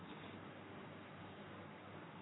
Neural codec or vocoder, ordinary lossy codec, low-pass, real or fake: codec, 44.1 kHz, 3.4 kbps, Pupu-Codec; AAC, 16 kbps; 7.2 kHz; fake